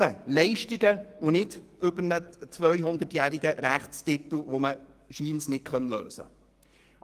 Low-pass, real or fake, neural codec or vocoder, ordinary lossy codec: 14.4 kHz; fake; codec, 44.1 kHz, 2.6 kbps, SNAC; Opus, 32 kbps